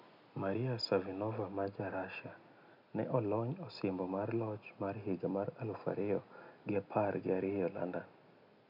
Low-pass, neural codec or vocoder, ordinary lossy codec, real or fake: 5.4 kHz; none; none; real